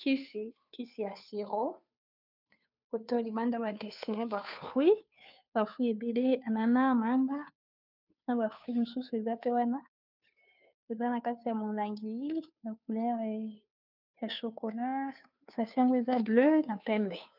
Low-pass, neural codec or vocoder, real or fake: 5.4 kHz; codec, 16 kHz, 2 kbps, FunCodec, trained on Chinese and English, 25 frames a second; fake